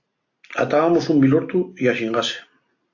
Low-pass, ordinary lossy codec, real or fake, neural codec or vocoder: 7.2 kHz; AAC, 48 kbps; real; none